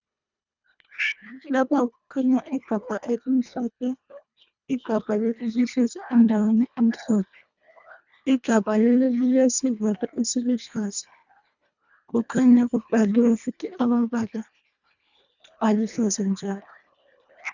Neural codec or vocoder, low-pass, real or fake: codec, 24 kHz, 1.5 kbps, HILCodec; 7.2 kHz; fake